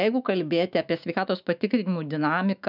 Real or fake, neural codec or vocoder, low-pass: fake; autoencoder, 48 kHz, 128 numbers a frame, DAC-VAE, trained on Japanese speech; 5.4 kHz